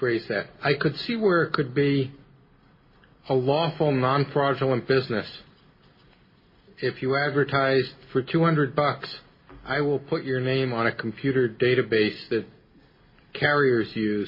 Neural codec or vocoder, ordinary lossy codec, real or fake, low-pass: none; MP3, 24 kbps; real; 5.4 kHz